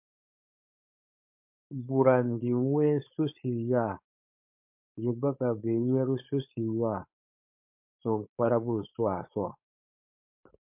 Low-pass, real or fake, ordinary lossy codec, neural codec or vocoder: 3.6 kHz; fake; MP3, 32 kbps; codec, 16 kHz, 4.8 kbps, FACodec